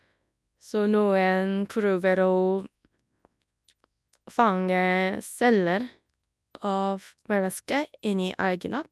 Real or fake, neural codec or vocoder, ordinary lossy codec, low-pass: fake; codec, 24 kHz, 0.9 kbps, WavTokenizer, large speech release; none; none